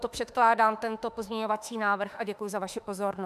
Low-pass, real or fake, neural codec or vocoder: 14.4 kHz; fake; autoencoder, 48 kHz, 32 numbers a frame, DAC-VAE, trained on Japanese speech